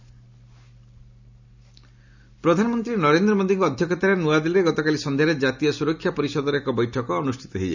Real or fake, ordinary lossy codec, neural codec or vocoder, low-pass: real; none; none; 7.2 kHz